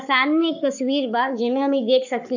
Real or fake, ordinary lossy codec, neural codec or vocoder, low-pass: fake; none; codec, 44.1 kHz, 7.8 kbps, Pupu-Codec; 7.2 kHz